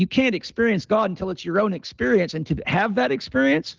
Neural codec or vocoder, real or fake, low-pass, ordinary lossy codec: none; real; 7.2 kHz; Opus, 16 kbps